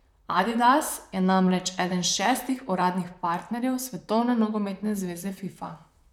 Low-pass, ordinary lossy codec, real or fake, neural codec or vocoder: 19.8 kHz; none; fake; vocoder, 44.1 kHz, 128 mel bands, Pupu-Vocoder